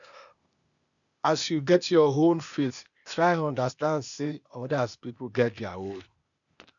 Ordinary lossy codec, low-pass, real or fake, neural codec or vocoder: none; 7.2 kHz; fake; codec, 16 kHz, 0.8 kbps, ZipCodec